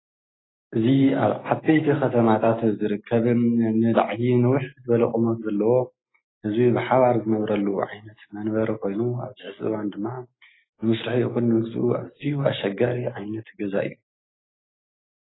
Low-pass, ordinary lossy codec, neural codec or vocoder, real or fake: 7.2 kHz; AAC, 16 kbps; none; real